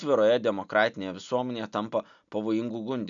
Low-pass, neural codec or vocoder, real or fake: 7.2 kHz; none; real